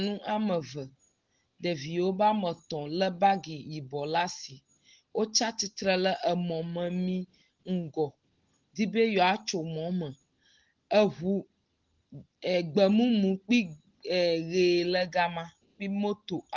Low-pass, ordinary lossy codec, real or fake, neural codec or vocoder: 7.2 kHz; Opus, 16 kbps; real; none